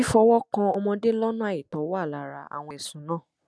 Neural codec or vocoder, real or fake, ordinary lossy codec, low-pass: none; real; none; none